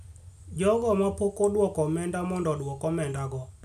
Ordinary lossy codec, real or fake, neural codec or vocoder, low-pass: none; real; none; 14.4 kHz